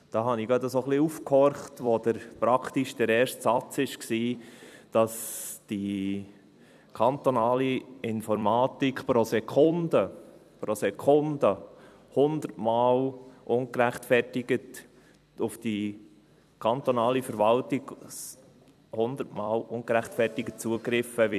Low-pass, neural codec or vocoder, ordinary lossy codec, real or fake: 14.4 kHz; vocoder, 44.1 kHz, 128 mel bands every 256 samples, BigVGAN v2; none; fake